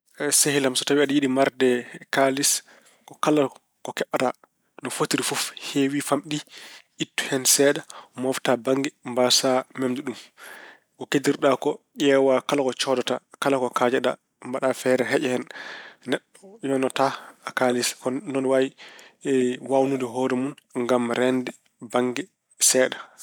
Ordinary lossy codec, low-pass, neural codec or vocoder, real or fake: none; none; none; real